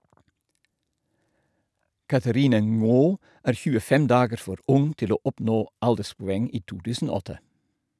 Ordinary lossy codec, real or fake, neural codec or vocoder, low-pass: none; real; none; none